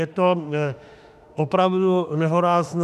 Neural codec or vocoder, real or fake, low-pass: autoencoder, 48 kHz, 32 numbers a frame, DAC-VAE, trained on Japanese speech; fake; 14.4 kHz